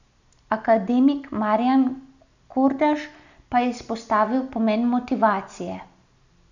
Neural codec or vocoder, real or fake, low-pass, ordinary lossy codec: none; real; 7.2 kHz; none